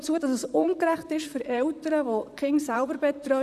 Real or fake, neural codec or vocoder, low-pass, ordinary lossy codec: fake; vocoder, 44.1 kHz, 128 mel bands, Pupu-Vocoder; 14.4 kHz; none